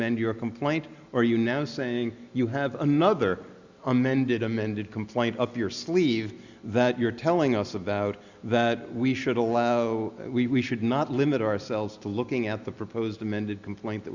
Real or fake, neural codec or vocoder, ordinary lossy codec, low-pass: real; none; Opus, 64 kbps; 7.2 kHz